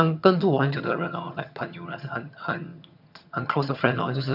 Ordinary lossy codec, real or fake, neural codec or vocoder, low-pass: none; fake; vocoder, 22.05 kHz, 80 mel bands, HiFi-GAN; 5.4 kHz